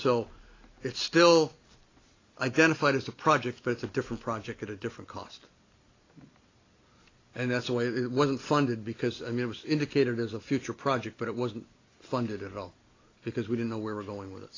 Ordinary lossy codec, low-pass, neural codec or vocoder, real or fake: AAC, 32 kbps; 7.2 kHz; none; real